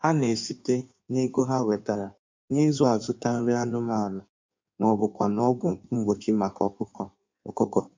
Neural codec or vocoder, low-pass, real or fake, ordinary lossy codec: codec, 16 kHz in and 24 kHz out, 1.1 kbps, FireRedTTS-2 codec; 7.2 kHz; fake; MP3, 64 kbps